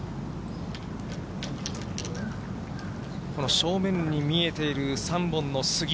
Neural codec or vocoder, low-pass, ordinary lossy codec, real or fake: none; none; none; real